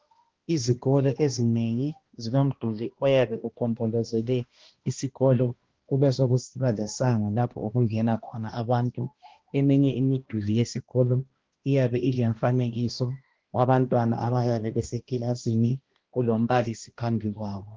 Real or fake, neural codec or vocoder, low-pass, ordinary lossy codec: fake; codec, 16 kHz, 1 kbps, X-Codec, HuBERT features, trained on balanced general audio; 7.2 kHz; Opus, 16 kbps